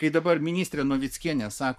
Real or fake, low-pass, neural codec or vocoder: fake; 14.4 kHz; codec, 44.1 kHz, 7.8 kbps, Pupu-Codec